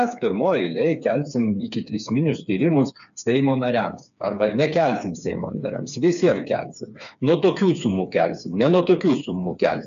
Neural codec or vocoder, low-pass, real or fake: codec, 16 kHz, 4 kbps, FreqCodec, smaller model; 7.2 kHz; fake